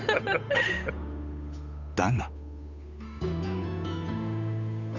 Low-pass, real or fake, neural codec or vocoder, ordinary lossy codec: 7.2 kHz; fake; codec, 16 kHz, 8 kbps, FunCodec, trained on Chinese and English, 25 frames a second; none